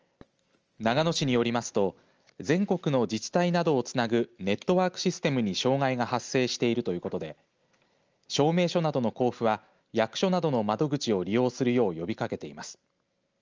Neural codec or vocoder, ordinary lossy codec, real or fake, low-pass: none; Opus, 24 kbps; real; 7.2 kHz